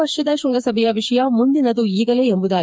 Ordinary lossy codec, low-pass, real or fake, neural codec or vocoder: none; none; fake; codec, 16 kHz, 4 kbps, FreqCodec, smaller model